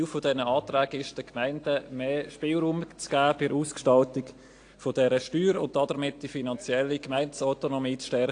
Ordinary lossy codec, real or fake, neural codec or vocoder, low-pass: AAC, 48 kbps; real; none; 9.9 kHz